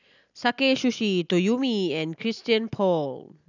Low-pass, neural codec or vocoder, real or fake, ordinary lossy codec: 7.2 kHz; none; real; none